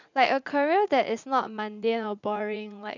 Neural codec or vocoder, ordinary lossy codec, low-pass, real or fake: vocoder, 44.1 kHz, 80 mel bands, Vocos; none; 7.2 kHz; fake